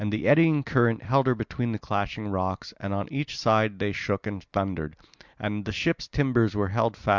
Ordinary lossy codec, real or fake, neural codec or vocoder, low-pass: Opus, 64 kbps; real; none; 7.2 kHz